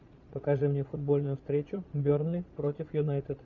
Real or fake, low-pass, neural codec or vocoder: fake; 7.2 kHz; vocoder, 22.05 kHz, 80 mel bands, Vocos